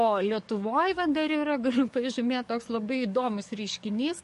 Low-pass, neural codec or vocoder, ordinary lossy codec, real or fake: 14.4 kHz; codec, 44.1 kHz, 7.8 kbps, DAC; MP3, 48 kbps; fake